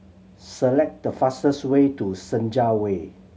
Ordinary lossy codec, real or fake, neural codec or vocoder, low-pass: none; real; none; none